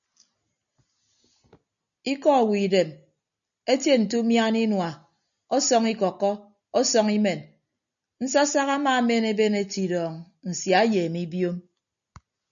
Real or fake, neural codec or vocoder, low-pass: real; none; 7.2 kHz